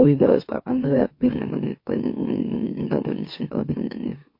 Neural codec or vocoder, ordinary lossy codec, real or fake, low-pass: autoencoder, 44.1 kHz, a latent of 192 numbers a frame, MeloTTS; MP3, 32 kbps; fake; 5.4 kHz